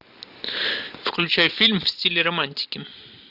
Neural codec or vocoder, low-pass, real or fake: none; 5.4 kHz; real